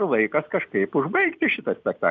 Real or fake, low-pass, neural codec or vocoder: real; 7.2 kHz; none